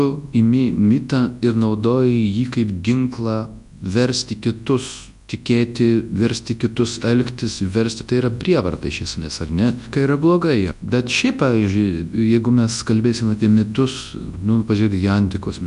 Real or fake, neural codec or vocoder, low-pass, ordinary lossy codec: fake; codec, 24 kHz, 0.9 kbps, WavTokenizer, large speech release; 10.8 kHz; MP3, 64 kbps